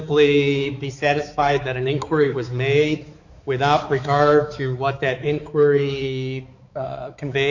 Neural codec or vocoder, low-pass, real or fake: codec, 16 kHz, 4 kbps, X-Codec, HuBERT features, trained on balanced general audio; 7.2 kHz; fake